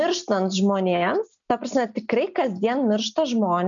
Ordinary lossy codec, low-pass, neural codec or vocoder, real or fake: MP3, 96 kbps; 7.2 kHz; none; real